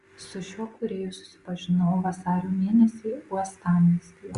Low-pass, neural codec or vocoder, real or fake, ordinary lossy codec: 10.8 kHz; none; real; Opus, 64 kbps